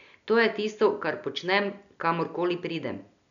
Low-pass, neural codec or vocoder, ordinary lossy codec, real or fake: 7.2 kHz; none; none; real